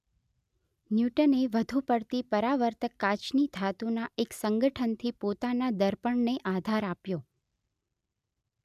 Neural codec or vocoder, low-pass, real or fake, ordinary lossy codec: none; 14.4 kHz; real; none